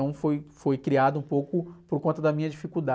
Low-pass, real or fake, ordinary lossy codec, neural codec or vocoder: none; real; none; none